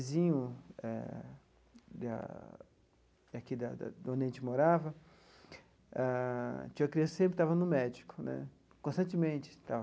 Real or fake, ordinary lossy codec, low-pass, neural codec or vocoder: real; none; none; none